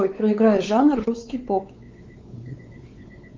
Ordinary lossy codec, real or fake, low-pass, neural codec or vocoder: Opus, 24 kbps; fake; 7.2 kHz; codec, 16 kHz, 4 kbps, X-Codec, WavLM features, trained on Multilingual LibriSpeech